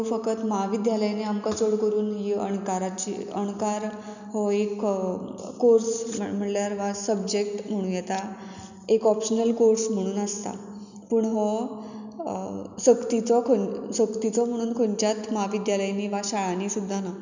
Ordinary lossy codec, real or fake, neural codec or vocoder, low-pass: none; real; none; 7.2 kHz